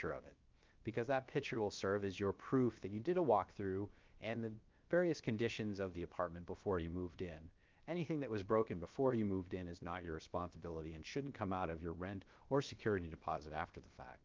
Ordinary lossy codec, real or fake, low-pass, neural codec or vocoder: Opus, 32 kbps; fake; 7.2 kHz; codec, 16 kHz, about 1 kbps, DyCAST, with the encoder's durations